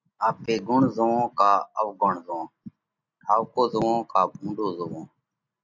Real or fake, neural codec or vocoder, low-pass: real; none; 7.2 kHz